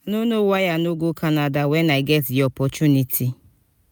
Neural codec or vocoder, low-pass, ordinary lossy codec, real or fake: none; none; none; real